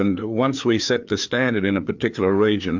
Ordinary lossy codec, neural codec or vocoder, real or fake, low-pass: MP3, 64 kbps; codec, 16 kHz, 4 kbps, FreqCodec, larger model; fake; 7.2 kHz